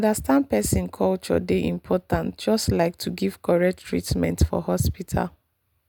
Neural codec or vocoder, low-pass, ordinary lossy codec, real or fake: none; none; none; real